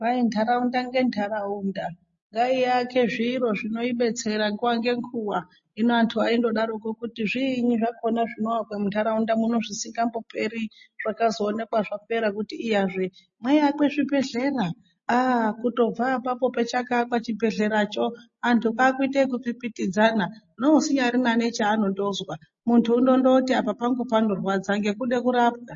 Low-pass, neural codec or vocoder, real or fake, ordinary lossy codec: 7.2 kHz; none; real; MP3, 32 kbps